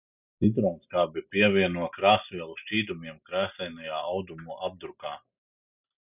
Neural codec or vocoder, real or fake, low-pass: none; real; 3.6 kHz